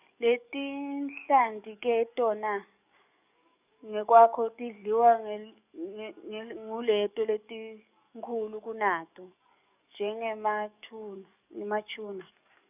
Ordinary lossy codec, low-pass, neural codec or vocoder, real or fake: none; 3.6 kHz; codec, 44.1 kHz, 7.8 kbps, DAC; fake